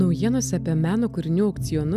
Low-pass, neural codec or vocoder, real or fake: 14.4 kHz; none; real